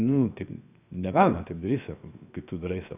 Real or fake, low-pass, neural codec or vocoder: fake; 3.6 kHz; codec, 16 kHz, 0.7 kbps, FocalCodec